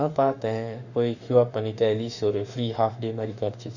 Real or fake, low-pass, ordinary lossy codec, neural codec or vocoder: fake; 7.2 kHz; none; autoencoder, 48 kHz, 32 numbers a frame, DAC-VAE, trained on Japanese speech